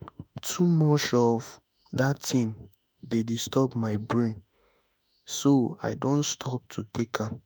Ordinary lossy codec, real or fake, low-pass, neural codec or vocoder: none; fake; none; autoencoder, 48 kHz, 32 numbers a frame, DAC-VAE, trained on Japanese speech